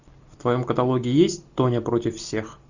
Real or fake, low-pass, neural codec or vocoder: real; 7.2 kHz; none